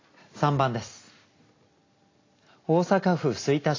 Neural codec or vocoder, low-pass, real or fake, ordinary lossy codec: none; 7.2 kHz; real; AAC, 32 kbps